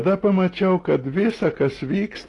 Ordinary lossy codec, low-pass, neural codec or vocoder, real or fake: AAC, 32 kbps; 10.8 kHz; none; real